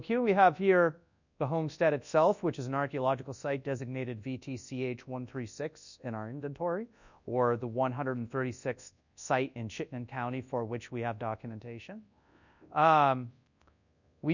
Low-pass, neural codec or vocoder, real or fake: 7.2 kHz; codec, 24 kHz, 0.9 kbps, WavTokenizer, large speech release; fake